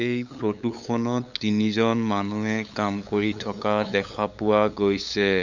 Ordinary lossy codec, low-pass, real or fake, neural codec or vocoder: none; 7.2 kHz; fake; codec, 16 kHz, 8 kbps, FunCodec, trained on LibriTTS, 25 frames a second